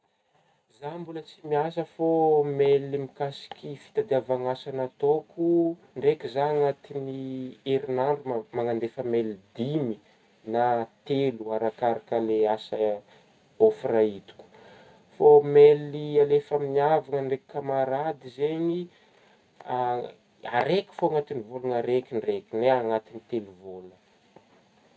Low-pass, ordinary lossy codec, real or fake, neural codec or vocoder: none; none; real; none